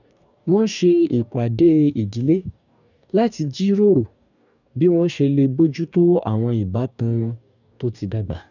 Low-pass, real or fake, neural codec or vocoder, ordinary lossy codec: 7.2 kHz; fake; codec, 44.1 kHz, 2.6 kbps, DAC; none